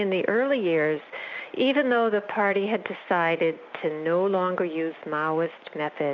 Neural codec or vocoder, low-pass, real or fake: none; 7.2 kHz; real